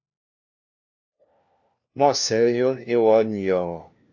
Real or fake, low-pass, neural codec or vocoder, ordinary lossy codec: fake; 7.2 kHz; codec, 16 kHz, 1 kbps, FunCodec, trained on LibriTTS, 50 frames a second; AAC, 48 kbps